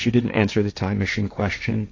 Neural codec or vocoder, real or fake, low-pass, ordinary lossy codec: codec, 16 kHz, 1.1 kbps, Voila-Tokenizer; fake; 7.2 kHz; AAC, 48 kbps